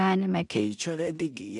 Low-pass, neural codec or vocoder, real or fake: 10.8 kHz; codec, 16 kHz in and 24 kHz out, 0.4 kbps, LongCat-Audio-Codec, two codebook decoder; fake